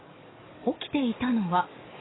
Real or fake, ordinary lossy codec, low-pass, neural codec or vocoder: fake; AAC, 16 kbps; 7.2 kHz; codec, 44.1 kHz, 7.8 kbps, DAC